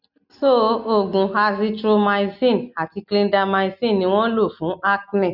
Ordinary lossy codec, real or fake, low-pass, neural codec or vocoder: none; real; 5.4 kHz; none